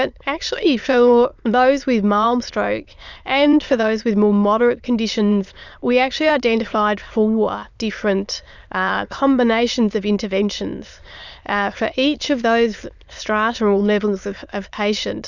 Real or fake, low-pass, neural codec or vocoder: fake; 7.2 kHz; autoencoder, 22.05 kHz, a latent of 192 numbers a frame, VITS, trained on many speakers